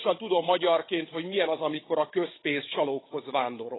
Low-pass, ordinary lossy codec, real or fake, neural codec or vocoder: 7.2 kHz; AAC, 16 kbps; fake; codec, 16 kHz, 16 kbps, FunCodec, trained on Chinese and English, 50 frames a second